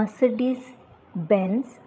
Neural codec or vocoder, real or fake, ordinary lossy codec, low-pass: codec, 16 kHz, 8 kbps, FreqCodec, larger model; fake; none; none